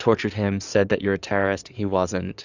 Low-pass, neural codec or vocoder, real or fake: 7.2 kHz; codec, 16 kHz in and 24 kHz out, 2.2 kbps, FireRedTTS-2 codec; fake